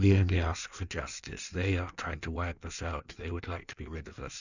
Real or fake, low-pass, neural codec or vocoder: fake; 7.2 kHz; codec, 16 kHz in and 24 kHz out, 1.1 kbps, FireRedTTS-2 codec